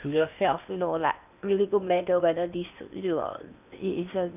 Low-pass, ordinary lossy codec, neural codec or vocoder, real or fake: 3.6 kHz; none; codec, 16 kHz in and 24 kHz out, 0.8 kbps, FocalCodec, streaming, 65536 codes; fake